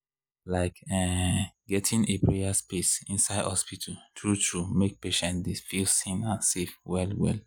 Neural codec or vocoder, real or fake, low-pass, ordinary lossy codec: none; real; none; none